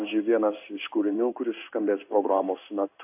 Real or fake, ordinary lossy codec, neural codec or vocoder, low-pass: fake; AAC, 32 kbps; codec, 16 kHz in and 24 kHz out, 1 kbps, XY-Tokenizer; 3.6 kHz